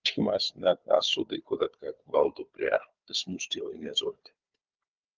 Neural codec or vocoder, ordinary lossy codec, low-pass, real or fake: codec, 16 kHz, 4 kbps, FunCodec, trained on Chinese and English, 50 frames a second; Opus, 24 kbps; 7.2 kHz; fake